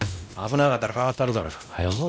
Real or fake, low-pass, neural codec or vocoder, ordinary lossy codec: fake; none; codec, 16 kHz, 1 kbps, X-Codec, WavLM features, trained on Multilingual LibriSpeech; none